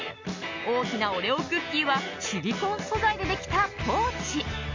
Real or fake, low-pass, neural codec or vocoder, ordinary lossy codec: real; 7.2 kHz; none; MP3, 64 kbps